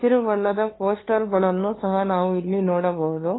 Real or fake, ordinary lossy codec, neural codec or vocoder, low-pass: fake; AAC, 16 kbps; codec, 16 kHz, 2 kbps, FunCodec, trained on LibriTTS, 25 frames a second; 7.2 kHz